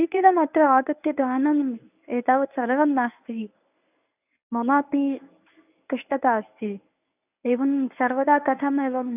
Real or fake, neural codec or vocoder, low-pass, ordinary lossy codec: fake; codec, 24 kHz, 0.9 kbps, WavTokenizer, medium speech release version 1; 3.6 kHz; none